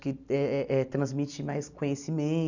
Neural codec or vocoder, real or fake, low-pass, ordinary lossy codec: none; real; 7.2 kHz; none